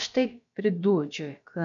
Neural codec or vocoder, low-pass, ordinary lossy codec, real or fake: codec, 16 kHz, about 1 kbps, DyCAST, with the encoder's durations; 7.2 kHz; MP3, 96 kbps; fake